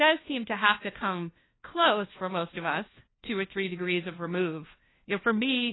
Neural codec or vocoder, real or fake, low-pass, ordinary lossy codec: codec, 16 kHz, 1 kbps, FunCodec, trained on LibriTTS, 50 frames a second; fake; 7.2 kHz; AAC, 16 kbps